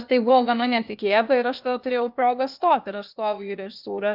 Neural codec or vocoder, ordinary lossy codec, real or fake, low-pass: codec, 16 kHz, 0.8 kbps, ZipCodec; Opus, 64 kbps; fake; 5.4 kHz